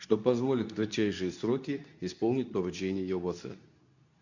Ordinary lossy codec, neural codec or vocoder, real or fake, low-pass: none; codec, 24 kHz, 0.9 kbps, WavTokenizer, medium speech release version 2; fake; 7.2 kHz